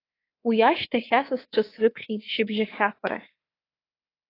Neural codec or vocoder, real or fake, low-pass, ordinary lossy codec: codec, 24 kHz, 3.1 kbps, DualCodec; fake; 5.4 kHz; AAC, 24 kbps